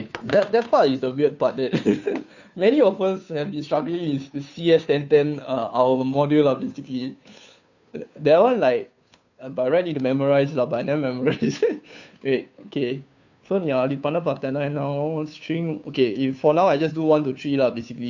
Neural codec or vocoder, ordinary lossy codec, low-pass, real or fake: codec, 16 kHz, 2 kbps, FunCodec, trained on Chinese and English, 25 frames a second; none; 7.2 kHz; fake